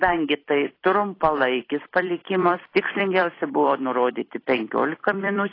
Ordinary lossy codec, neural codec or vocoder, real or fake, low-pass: AAC, 24 kbps; vocoder, 44.1 kHz, 128 mel bands every 256 samples, BigVGAN v2; fake; 5.4 kHz